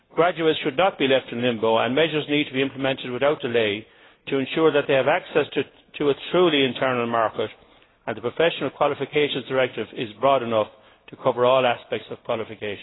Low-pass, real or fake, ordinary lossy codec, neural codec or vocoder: 7.2 kHz; real; AAC, 16 kbps; none